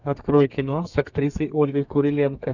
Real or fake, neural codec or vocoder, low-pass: fake; codec, 32 kHz, 1.9 kbps, SNAC; 7.2 kHz